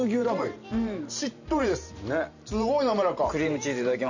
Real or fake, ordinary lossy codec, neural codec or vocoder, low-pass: fake; none; vocoder, 44.1 kHz, 128 mel bands every 512 samples, BigVGAN v2; 7.2 kHz